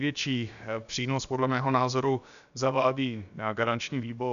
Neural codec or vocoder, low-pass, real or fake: codec, 16 kHz, about 1 kbps, DyCAST, with the encoder's durations; 7.2 kHz; fake